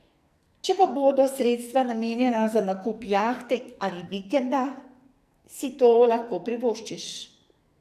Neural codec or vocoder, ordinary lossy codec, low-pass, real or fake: codec, 44.1 kHz, 2.6 kbps, SNAC; none; 14.4 kHz; fake